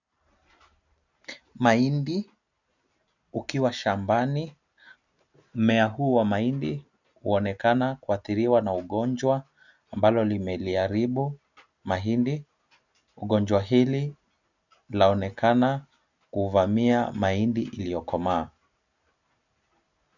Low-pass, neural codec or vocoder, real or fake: 7.2 kHz; none; real